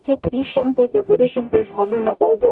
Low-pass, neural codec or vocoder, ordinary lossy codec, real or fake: 10.8 kHz; codec, 44.1 kHz, 0.9 kbps, DAC; MP3, 96 kbps; fake